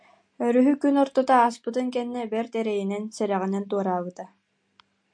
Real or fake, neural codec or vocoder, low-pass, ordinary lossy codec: real; none; 9.9 kHz; MP3, 64 kbps